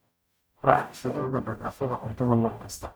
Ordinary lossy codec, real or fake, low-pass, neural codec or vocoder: none; fake; none; codec, 44.1 kHz, 0.9 kbps, DAC